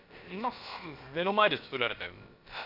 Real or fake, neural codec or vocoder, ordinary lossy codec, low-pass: fake; codec, 16 kHz, about 1 kbps, DyCAST, with the encoder's durations; none; 5.4 kHz